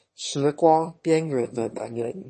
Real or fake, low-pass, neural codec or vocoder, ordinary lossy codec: fake; 9.9 kHz; autoencoder, 22.05 kHz, a latent of 192 numbers a frame, VITS, trained on one speaker; MP3, 32 kbps